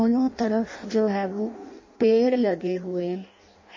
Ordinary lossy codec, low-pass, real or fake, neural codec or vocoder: MP3, 32 kbps; 7.2 kHz; fake; codec, 16 kHz in and 24 kHz out, 0.6 kbps, FireRedTTS-2 codec